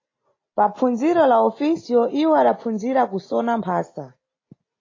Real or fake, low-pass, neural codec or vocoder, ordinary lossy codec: real; 7.2 kHz; none; AAC, 32 kbps